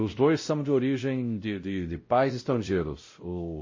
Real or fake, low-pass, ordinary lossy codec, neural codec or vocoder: fake; 7.2 kHz; MP3, 32 kbps; codec, 16 kHz, 0.5 kbps, X-Codec, WavLM features, trained on Multilingual LibriSpeech